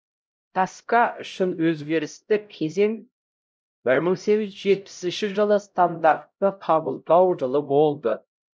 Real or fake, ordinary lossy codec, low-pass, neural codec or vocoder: fake; none; none; codec, 16 kHz, 0.5 kbps, X-Codec, HuBERT features, trained on LibriSpeech